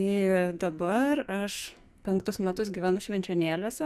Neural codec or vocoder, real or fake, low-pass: codec, 44.1 kHz, 2.6 kbps, SNAC; fake; 14.4 kHz